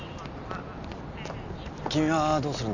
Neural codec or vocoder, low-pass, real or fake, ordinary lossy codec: none; 7.2 kHz; real; Opus, 64 kbps